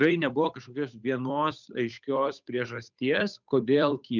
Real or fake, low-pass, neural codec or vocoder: fake; 7.2 kHz; codec, 16 kHz, 8 kbps, FunCodec, trained on Chinese and English, 25 frames a second